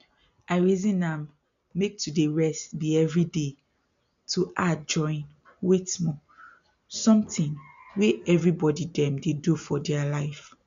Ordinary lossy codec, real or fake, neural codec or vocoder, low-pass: MP3, 64 kbps; real; none; 7.2 kHz